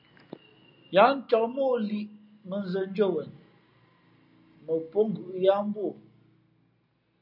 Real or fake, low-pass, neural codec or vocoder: real; 5.4 kHz; none